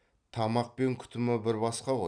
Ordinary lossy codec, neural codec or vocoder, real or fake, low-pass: none; none; real; 9.9 kHz